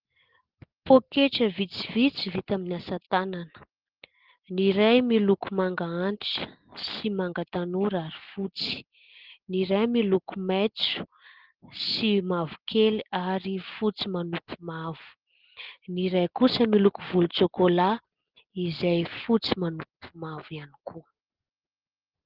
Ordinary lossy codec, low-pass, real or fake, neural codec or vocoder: Opus, 16 kbps; 5.4 kHz; real; none